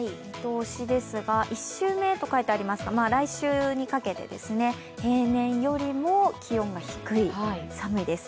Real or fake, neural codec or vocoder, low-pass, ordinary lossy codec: real; none; none; none